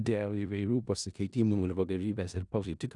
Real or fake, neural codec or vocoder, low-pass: fake; codec, 16 kHz in and 24 kHz out, 0.4 kbps, LongCat-Audio-Codec, four codebook decoder; 10.8 kHz